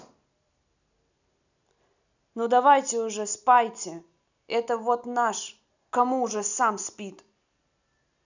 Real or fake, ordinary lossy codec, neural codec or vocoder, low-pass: real; none; none; 7.2 kHz